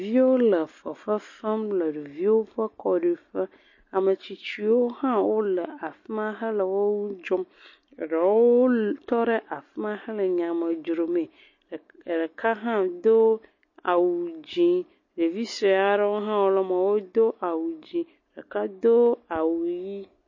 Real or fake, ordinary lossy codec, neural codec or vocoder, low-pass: real; MP3, 32 kbps; none; 7.2 kHz